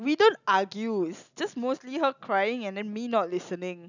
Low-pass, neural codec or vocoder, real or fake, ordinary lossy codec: 7.2 kHz; none; real; none